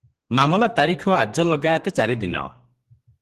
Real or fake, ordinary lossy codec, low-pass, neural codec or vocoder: fake; Opus, 16 kbps; 14.4 kHz; codec, 32 kHz, 1.9 kbps, SNAC